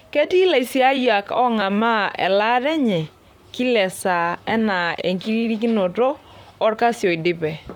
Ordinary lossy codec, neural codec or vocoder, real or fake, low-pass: none; vocoder, 44.1 kHz, 128 mel bands, Pupu-Vocoder; fake; 19.8 kHz